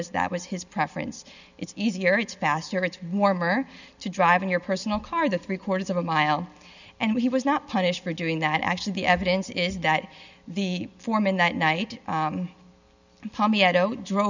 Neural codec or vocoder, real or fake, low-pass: none; real; 7.2 kHz